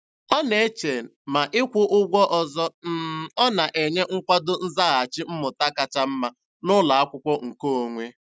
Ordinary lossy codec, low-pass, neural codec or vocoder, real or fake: none; none; none; real